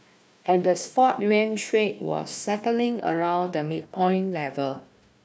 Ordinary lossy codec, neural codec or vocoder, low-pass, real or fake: none; codec, 16 kHz, 1 kbps, FunCodec, trained on Chinese and English, 50 frames a second; none; fake